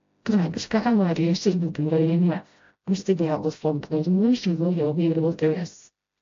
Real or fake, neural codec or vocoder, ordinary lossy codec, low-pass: fake; codec, 16 kHz, 0.5 kbps, FreqCodec, smaller model; AAC, 48 kbps; 7.2 kHz